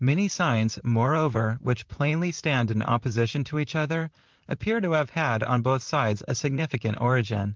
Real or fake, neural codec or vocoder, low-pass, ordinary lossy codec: fake; vocoder, 44.1 kHz, 128 mel bands, Pupu-Vocoder; 7.2 kHz; Opus, 32 kbps